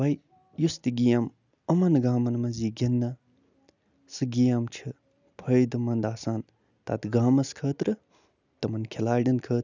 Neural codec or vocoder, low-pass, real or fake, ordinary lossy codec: none; 7.2 kHz; real; none